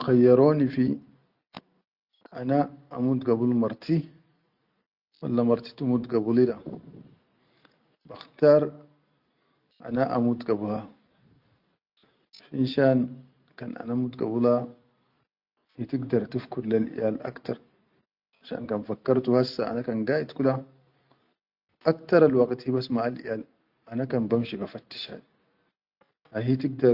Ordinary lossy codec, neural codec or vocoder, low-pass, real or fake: Opus, 64 kbps; none; 5.4 kHz; real